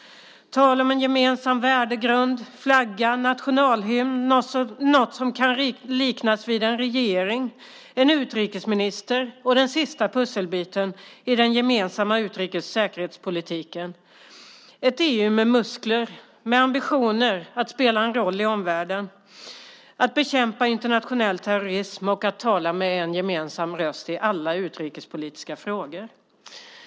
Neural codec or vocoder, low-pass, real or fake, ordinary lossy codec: none; none; real; none